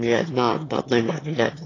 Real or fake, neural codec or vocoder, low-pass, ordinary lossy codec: fake; autoencoder, 22.05 kHz, a latent of 192 numbers a frame, VITS, trained on one speaker; 7.2 kHz; AAC, 32 kbps